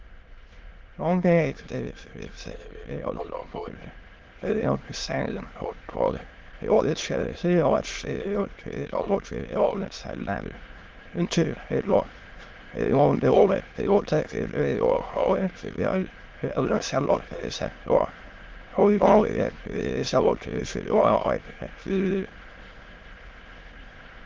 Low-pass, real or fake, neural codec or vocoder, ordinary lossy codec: 7.2 kHz; fake; autoencoder, 22.05 kHz, a latent of 192 numbers a frame, VITS, trained on many speakers; Opus, 16 kbps